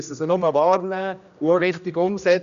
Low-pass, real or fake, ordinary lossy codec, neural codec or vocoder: 7.2 kHz; fake; none; codec, 16 kHz, 1 kbps, X-Codec, HuBERT features, trained on general audio